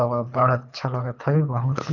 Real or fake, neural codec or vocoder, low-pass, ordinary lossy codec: fake; codec, 24 kHz, 3 kbps, HILCodec; 7.2 kHz; none